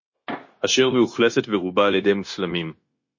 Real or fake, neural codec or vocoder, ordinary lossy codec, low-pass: fake; codec, 16 kHz, 0.9 kbps, LongCat-Audio-Codec; MP3, 32 kbps; 7.2 kHz